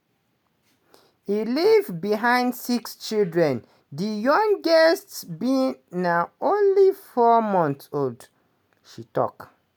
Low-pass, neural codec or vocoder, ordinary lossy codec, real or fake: none; none; none; real